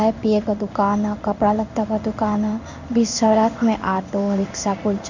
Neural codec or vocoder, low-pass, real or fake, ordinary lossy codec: codec, 16 kHz in and 24 kHz out, 1 kbps, XY-Tokenizer; 7.2 kHz; fake; none